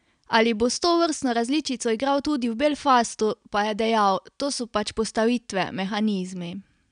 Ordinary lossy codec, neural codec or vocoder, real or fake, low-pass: none; none; real; 9.9 kHz